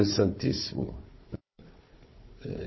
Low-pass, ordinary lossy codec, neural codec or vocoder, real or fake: 7.2 kHz; MP3, 24 kbps; codec, 16 kHz, 4 kbps, FunCodec, trained on Chinese and English, 50 frames a second; fake